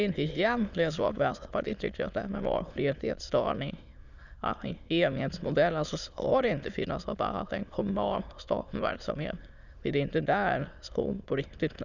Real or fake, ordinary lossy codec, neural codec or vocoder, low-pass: fake; none; autoencoder, 22.05 kHz, a latent of 192 numbers a frame, VITS, trained on many speakers; 7.2 kHz